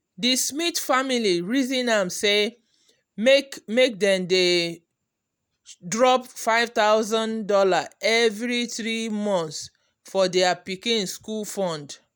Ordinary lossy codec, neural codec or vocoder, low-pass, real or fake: none; none; none; real